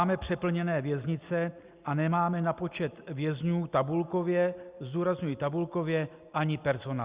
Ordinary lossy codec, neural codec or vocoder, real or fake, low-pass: Opus, 64 kbps; none; real; 3.6 kHz